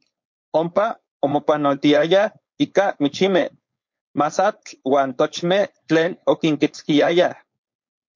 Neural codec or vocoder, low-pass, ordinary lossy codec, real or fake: codec, 16 kHz, 4.8 kbps, FACodec; 7.2 kHz; MP3, 48 kbps; fake